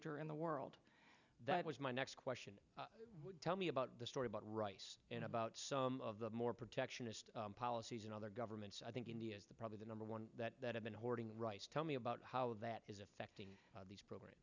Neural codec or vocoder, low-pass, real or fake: none; 7.2 kHz; real